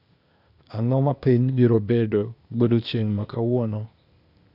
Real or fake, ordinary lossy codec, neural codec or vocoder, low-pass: fake; none; codec, 16 kHz, 0.8 kbps, ZipCodec; 5.4 kHz